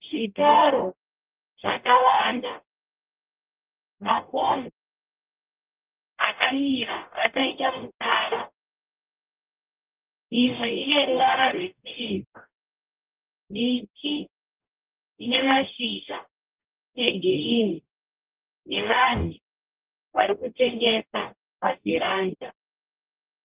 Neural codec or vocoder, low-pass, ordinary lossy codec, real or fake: codec, 44.1 kHz, 0.9 kbps, DAC; 3.6 kHz; Opus, 24 kbps; fake